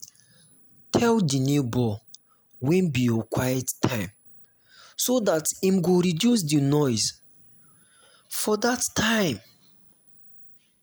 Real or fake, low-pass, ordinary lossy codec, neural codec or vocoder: real; none; none; none